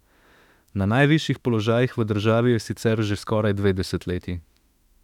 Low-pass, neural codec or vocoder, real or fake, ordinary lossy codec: 19.8 kHz; autoencoder, 48 kHz, 32 numbers a frame, DAC-VAE, trained on Japanese speech; fake; none